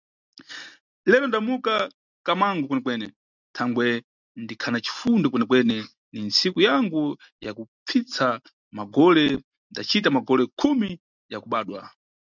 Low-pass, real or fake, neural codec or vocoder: 7.2 kHz; real; none